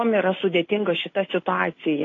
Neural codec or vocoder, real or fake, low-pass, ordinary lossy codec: none; real; 7.2 kHz; AAC, 32 kbps